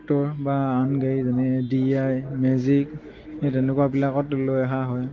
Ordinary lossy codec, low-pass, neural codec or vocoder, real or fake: Opus, 16 kbps; 7.2 kHz; none; real